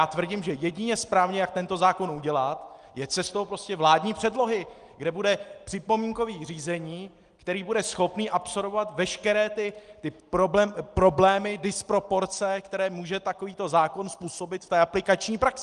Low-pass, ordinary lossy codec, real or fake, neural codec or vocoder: 9.9 kHz; Opus, 24 kbps; real; none